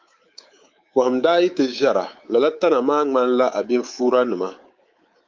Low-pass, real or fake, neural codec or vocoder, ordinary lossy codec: 7.2 kHz; fake; codec, 24 kHz, 3.1 kbps, DualCodec; Opus, 24 kbps